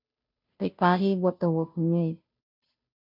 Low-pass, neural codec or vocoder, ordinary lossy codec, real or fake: 5.4 kHz; codec, 16 kHz, 0.5 kbps, FunCodec, trained on Chinese and English, 25 frames a second; MP3, 48 kbps; fake